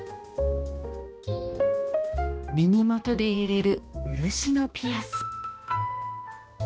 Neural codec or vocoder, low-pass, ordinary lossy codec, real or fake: codec, 16 kHz, 1 kbps, X-Codec, HuBERT features, trained on balanced general audio; none; none; fake